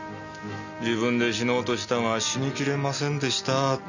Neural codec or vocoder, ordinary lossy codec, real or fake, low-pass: none; none; real; 7.2 kHz